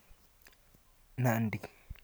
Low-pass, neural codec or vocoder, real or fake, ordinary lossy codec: none; none; real; none